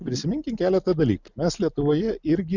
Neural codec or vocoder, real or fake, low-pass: none; real; 7.2 kHz